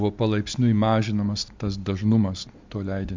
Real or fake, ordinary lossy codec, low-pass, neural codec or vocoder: real; MP3, 64 kbps; 7.2 kHz; none